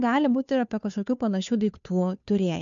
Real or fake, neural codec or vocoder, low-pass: fake; codec, 16 kHz, 2 kbps, FunCodec, trained on Chinese and English, 25 frames a second; 7.2 kHz